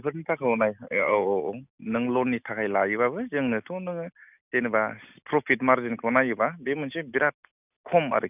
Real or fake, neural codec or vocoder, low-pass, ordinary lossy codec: real; none; 3.6 kHz; none